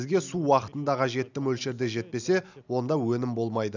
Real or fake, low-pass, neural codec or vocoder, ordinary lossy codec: real; 7.2 kHz; none; none